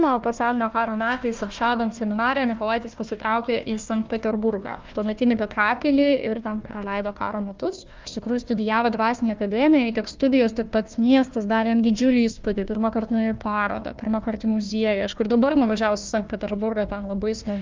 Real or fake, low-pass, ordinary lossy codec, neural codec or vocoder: fake; 7.2 kHz; Opus, 24 kbps; codec, 16 kHz, 1 kbps, FunCodec, trained on Chinese and English, 50 frames a second